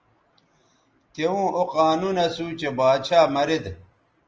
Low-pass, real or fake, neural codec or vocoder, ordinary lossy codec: 7.2 kHz; real; none; Opus, 24 kbps